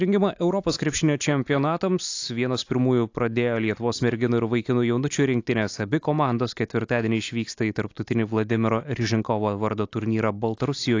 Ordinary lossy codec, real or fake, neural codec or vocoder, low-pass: AAC, 48 kbps; real; none; 7.2 kHz